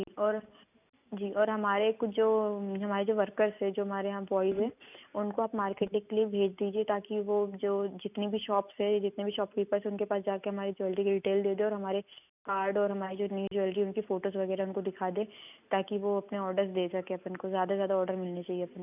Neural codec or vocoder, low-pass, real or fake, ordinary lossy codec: none; 3.6 kHz; real; none